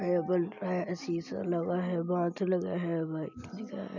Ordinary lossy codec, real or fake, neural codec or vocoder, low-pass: none; real; none; 7.2 kHz